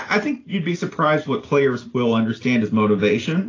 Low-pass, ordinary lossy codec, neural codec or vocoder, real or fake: 7.2 kHz; AAC, 32 kbps; none; real